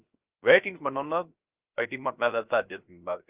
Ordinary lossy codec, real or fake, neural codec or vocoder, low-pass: Opus, 24 kbps; fake; codec, 16 kHz, 0.3 kbps, FocalCodec; 3.6 kHz